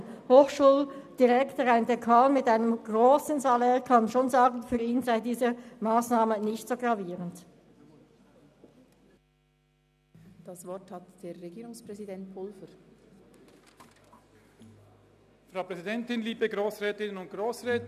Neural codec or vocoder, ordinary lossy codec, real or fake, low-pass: none; none; real; 14.4 kHz